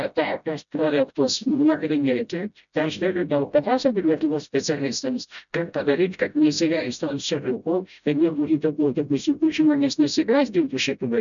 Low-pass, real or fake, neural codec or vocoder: 7.2 kHz; fake; codec, 16 kHz, 0.5 kbps, FreqCodec, smaller model